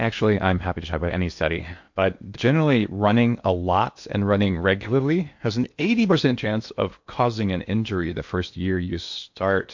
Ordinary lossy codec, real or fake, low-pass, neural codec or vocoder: MP3, 48 kbps; fake; 7.2 kHz; codec, 16 kHz in and 24 kHz out, 0.8 kbps, FocalCodec, streaming, 65536 codes